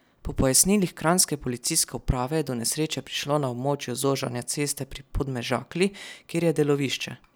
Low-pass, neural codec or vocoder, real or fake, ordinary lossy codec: none; none; real; none